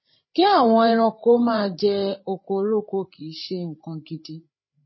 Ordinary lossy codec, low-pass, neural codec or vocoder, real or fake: MP3, 24 kbps; 7.2 kHz; codec, 16 kHz, 8 kbps, FreqCodec, larger model; fake